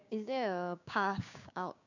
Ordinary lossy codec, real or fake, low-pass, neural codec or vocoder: none; fake; 7.2 kHz; codec, 16 kHz, 6 kbps, DAC